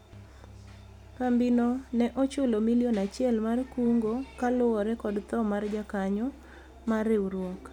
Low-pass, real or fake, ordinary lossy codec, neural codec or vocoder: 19.8 kHz; real; none; none